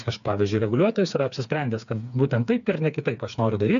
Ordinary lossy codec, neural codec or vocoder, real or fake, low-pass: MP3, 96 kbps; codec, 16 kHz, 4 kbps, FreqCodec, smaller model; fake; 7.2 kHz